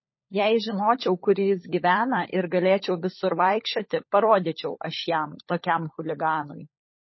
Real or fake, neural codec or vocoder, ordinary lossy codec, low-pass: fake; codec, 16 kHz, 16 kbps, FunCodec, trained on LibriTTS, 50 frames a second; MP3, 24 kbps; 7.2 kHz